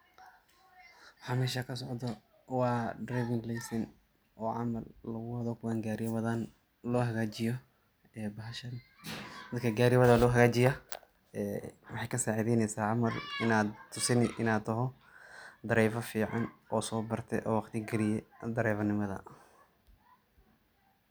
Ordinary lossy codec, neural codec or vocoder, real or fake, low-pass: none; none; real; none